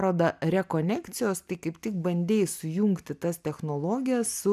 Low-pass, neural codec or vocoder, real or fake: 14.4 kHz; none; real